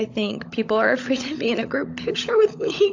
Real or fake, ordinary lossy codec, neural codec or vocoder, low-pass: fake; AAC, 48 kbps; codec, 16 kHz, 16 kbps, FunCodec, trained on LibriTTS, 50 frames a second; 7.2 kHz